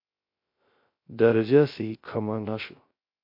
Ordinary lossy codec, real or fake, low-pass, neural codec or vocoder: MP3, 32 kbps; fake; 5.4 kHz; codec, 16 kHz, 0.3 kbps, FocalCodec